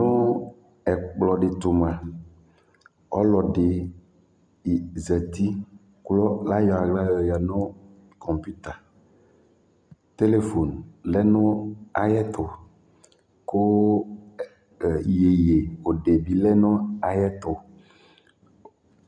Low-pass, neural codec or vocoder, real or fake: 9.9 kHz; none; real